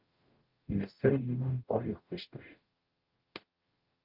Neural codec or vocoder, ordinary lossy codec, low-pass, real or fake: codec, 44.1 kHz, 0.9 kbps, DAC; Opus, 32 kbps; 5.4 kHz; fake